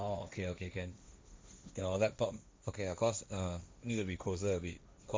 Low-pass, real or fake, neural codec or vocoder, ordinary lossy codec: none; fake; codec, 16 kHz, 1.1 kbps, Voila-Tokenizer; none